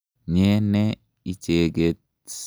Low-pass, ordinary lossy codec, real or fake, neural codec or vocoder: none; none; real; none